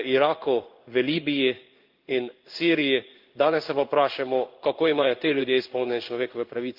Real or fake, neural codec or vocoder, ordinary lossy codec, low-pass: fake; codec, 16 kHz in and 24 kHz out, 1 kbps, XY-Tokenizer; Opus, 32 kbps; 5.4 kHz